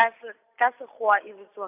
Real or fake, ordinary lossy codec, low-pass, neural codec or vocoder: real; AAC, 32 kbps; 3.6 kHz; none